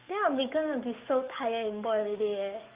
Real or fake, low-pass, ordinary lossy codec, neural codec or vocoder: fake; 3.6 kHz; Opus, 24 kbps; codec, 44.1 kHz, 7.8 kbps, DAC